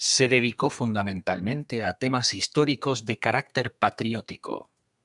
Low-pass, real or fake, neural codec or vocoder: 10.8 kHz; fake; codec, 32 kHz, 1.9 kbps, SNAC